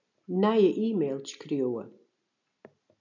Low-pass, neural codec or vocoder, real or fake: 7.2 kHz; none; real